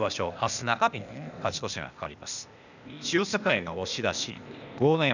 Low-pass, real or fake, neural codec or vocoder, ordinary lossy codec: 7.2 kHz; fake; codec, 16 kHz, 0.8 kbps, ZipCodec; none